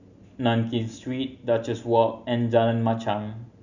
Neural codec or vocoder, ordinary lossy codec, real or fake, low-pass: none; none; real; 7.2 kHz